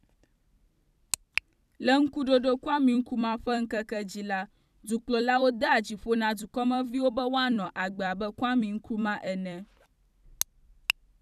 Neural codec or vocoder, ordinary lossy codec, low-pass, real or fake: vocoder, 44.1 kHz, 128 mel bands every 256 samples, BigVGAN v2; none; 14.4 kHz; fake